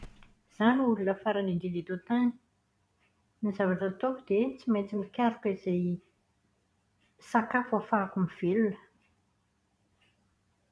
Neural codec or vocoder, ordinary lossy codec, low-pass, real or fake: vocoder, 22.05 kHz, 80 mel bands, Vocos; none; none; fake